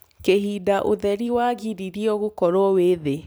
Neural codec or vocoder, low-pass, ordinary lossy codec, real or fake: vocoder, 44.1 kHz, 128 mel bands every 256 samples, BigVGAN v2; none; none; fake